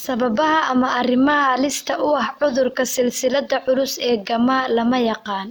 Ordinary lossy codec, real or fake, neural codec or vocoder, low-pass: none; real; none; none